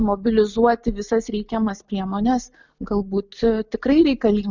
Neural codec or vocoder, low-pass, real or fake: none; 7.2 kHz; real